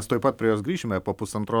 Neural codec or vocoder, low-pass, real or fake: none; 19.8 kHz; real